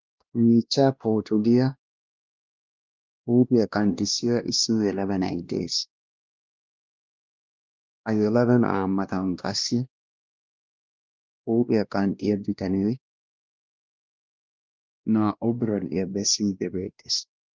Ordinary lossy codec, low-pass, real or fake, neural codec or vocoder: Opus, 32 kbps; 7.2 kHz; fake; codec, 16 kHz, 1 kbps, X-Codec, WavLM features, trained on Multilingual LibriSpeech